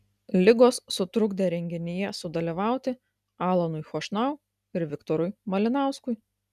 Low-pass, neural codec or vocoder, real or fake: 14.4 kHz; none; real